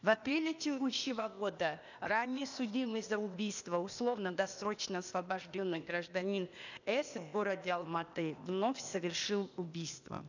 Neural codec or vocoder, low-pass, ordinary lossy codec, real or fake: codec, 16 kHz, 0.8 kbps, ZipCodec; 7.2 kHz; none; fake